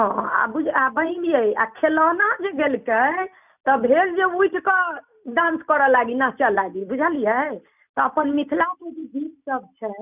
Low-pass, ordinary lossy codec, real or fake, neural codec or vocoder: 3.6 kHz; none; real; none